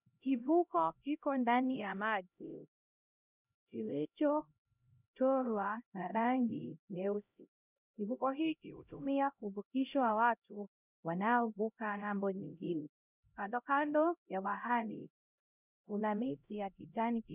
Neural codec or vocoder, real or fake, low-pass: codec, 16 kHz, 0.5 kbps, X-Codec, HuBERT features, trained on LibriSpeech; fake; 3.6 kHz